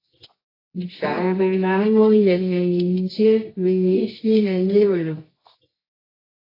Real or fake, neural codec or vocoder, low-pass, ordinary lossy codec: fake; codec, 24 kHz, 0.9 kbps, WavTokenizer, medium music audio release; 5.4 kHz; AAC, 24 kbps